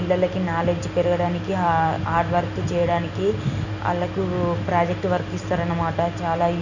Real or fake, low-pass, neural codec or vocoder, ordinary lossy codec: real; 7.2 kHz; none; none